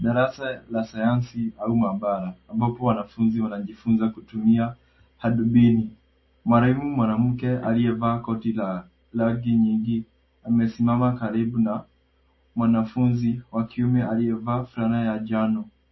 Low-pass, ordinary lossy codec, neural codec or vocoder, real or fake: 7.2 kHz; MP3, 24 kbps; none; real